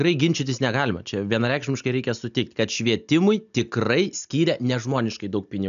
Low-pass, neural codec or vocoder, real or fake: 7.2 kHz; none; real